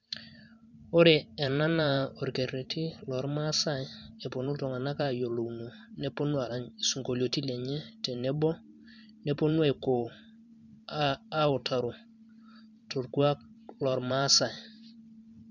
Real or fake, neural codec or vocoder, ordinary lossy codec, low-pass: fake; vocoder, 44.1 kHz, 128 mel bands every 512 samples, BigVGAN v2; none; 7.2 kHz